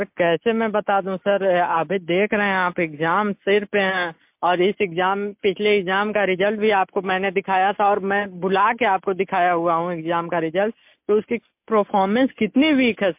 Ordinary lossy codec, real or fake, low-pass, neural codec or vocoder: MP3, 32 kbps; real; 3.6 kHz; none